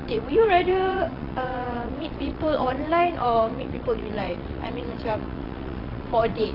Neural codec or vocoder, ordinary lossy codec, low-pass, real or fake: vocoder, 22.05 kHz, 80 mel bands, WaveNeXt; AAC, 32 kbps; 5.4 kHz; fake